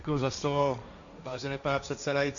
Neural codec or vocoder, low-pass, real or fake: codec, 16 kHz, 1.1 kbps, Voila-Tokenizer; 7.2 kHz; fake